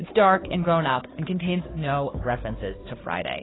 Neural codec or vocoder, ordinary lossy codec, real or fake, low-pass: codec, 16 kHz, 2 kbps, FunCodec, trained on Chinese and English, 25 frames a second; AAC, 16 kbps; fake; 7.2 kHz